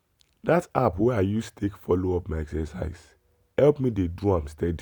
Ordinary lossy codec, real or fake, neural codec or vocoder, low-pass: none; real; none; none